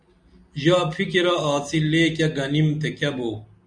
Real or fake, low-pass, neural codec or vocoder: real; 9.9 kHz; none